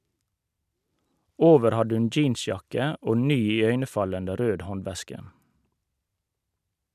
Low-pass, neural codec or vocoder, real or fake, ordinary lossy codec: 14.4 kHz; none; real; none